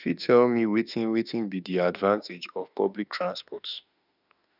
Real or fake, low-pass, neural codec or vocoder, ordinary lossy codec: fake; 5.4 kHz; autoencoder, 48 kHz, 32 numbers a frame, DAC-VAE, trained on Japanese speech; none